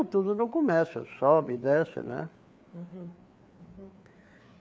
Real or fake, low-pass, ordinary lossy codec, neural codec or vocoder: fake; none; none; codec, 16 kHz, 4 kbps, FreqCodec, larger model